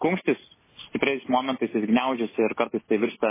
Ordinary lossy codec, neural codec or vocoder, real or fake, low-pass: MP3, 16 kbps; none; real; 3.6 kHz